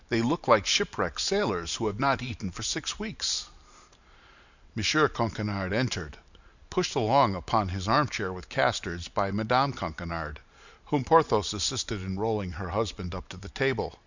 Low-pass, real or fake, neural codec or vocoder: 7.2 kHz; fake; vocoder, 44.1 kHz, 128 mel bands every 512 samples, BigVGAN v2